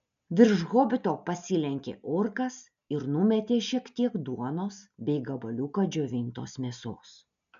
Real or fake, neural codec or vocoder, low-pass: real; none; 7.2 kHz